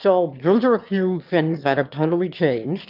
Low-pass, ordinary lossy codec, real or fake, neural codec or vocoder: 5.4 kHz; Opus, 24 kbps; fake; autoencoder, 22.05 kHz, a latent of 192 numbers a frame, VITS, trained on one speaker